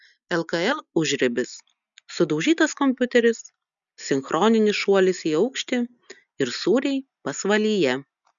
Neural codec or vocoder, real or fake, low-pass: none; real; 7.2 kHz